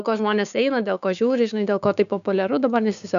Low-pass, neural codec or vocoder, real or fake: 7.2 kHz; codec, 16 kHz, 6 kbps, DAC; fake